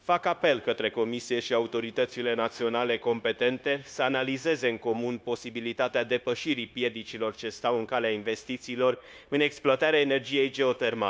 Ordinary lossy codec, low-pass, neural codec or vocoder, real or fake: none; none; codec, 16 kHz, 0.9 kbps, LongCat-Audio-Codec; fake